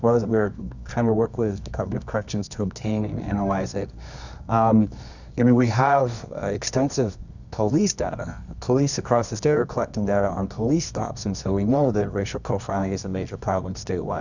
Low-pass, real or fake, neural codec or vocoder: 7.2 kHz; fake; codec, 24 kHz, 0.9 kbps, WavTokenizer, medium music audio release